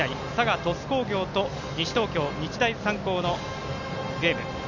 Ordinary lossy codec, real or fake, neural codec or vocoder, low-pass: none; real; none; 7.2 kHz